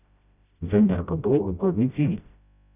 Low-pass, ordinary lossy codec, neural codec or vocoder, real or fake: 3.6 kHz; none; codec, 16 kHz, 0.5 kbps, FreqCodec, smaller model; fake